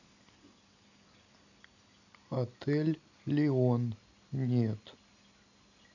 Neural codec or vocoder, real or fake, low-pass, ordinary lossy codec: none; real; 7.2 kHz; none